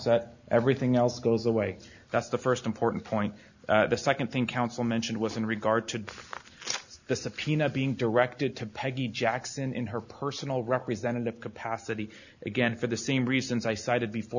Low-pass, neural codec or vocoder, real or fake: 7.2 kHz; none; real